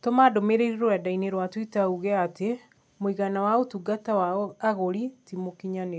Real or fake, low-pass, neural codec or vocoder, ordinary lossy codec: real; none; none; none